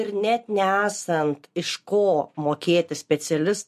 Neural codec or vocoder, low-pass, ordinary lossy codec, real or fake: none; 14.4 kHz; MP3, 64 kbps; real